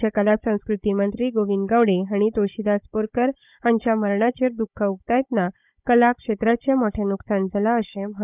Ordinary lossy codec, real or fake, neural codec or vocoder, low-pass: none; fake; codec, 16 kHz, 16 kbps, FunCodec, trained on Chinese and English, 50 frames a second; 3.6 kHz